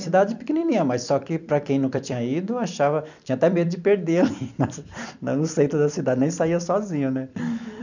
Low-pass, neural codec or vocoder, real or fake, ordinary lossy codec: 7.2 kHz; vocoder, 44.1 kHz, 128 mel bands every 256 samples, BigVGAN v2; fake; none